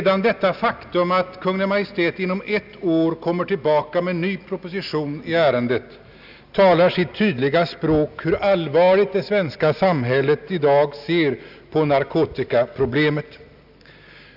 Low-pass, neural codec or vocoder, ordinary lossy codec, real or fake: 5.4 kHz; none; none; real